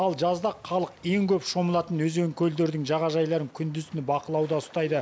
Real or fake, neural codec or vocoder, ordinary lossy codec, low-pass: real; none; none; none